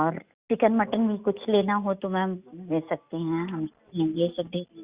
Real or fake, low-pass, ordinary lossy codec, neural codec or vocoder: real; 3.6 kHz; Opus, 64 kbps; none